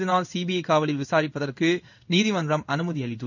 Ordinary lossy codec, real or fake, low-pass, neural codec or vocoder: none; fake; 7.2 kHz; codec, 16 kHz in and 24 kHz out, 1 kbps, XY-Tokenizer